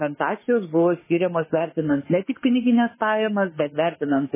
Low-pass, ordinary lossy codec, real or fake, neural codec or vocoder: 3.6 kHz; MP3, 16 kbps; fake; autoencoder, 48 kHz, 32 numbers a frame, DAC-VAE, trained on Japanese speech